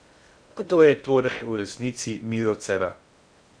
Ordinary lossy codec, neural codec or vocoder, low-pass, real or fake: Opus, 64 kbps; codec, 16 kHz in and 24 kHz out, 0.6 kbps, FocalCodec, streaming, 2048 codes; 9.9 kHz; fake